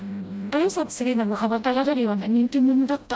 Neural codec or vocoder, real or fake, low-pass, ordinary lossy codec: codec, 16 kHz, 0.5 kbps, FreqCodec, smaller model; fake; none; none